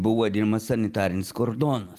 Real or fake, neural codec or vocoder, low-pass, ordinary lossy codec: real; none; 14.4 kHz; Opus, 32 kbps